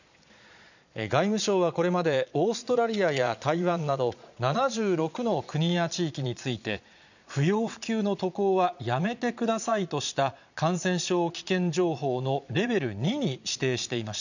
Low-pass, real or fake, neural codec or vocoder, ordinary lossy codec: 7.2 kHz; fake; vocoder, 22.05 kHz, 80 mel bands, Vocos; none